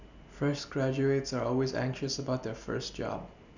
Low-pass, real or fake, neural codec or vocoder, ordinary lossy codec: 7.2 kHz; real; none; none